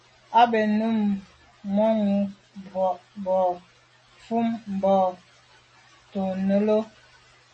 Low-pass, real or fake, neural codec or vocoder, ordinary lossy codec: 9.9 kHz; real; none; MP3, 32 kbps